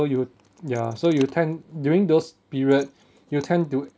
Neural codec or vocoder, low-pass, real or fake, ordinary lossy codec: none; none; real; none